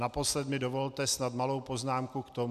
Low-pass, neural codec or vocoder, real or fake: 14.4 kHz; none; real